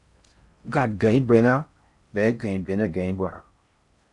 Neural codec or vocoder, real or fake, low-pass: codec, 16 kHz in and 24 kHz out, 0.6 kbps, FocalCodec, streaming, 4096 codes; fake; 10.8 kHz